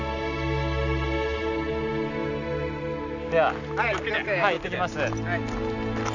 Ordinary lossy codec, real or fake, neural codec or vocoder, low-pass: none; real; none; 7.2 kHz